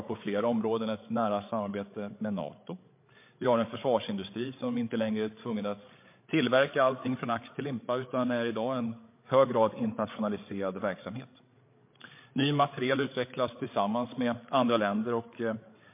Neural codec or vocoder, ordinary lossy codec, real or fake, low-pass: codec, 16 kHz, 8 kbps, FreqCodec, larger model; MP3, 24 kbps; fake; 3.6 kHz